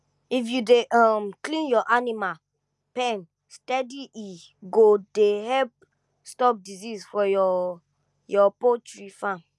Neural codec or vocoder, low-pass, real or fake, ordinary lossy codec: none; none; real; none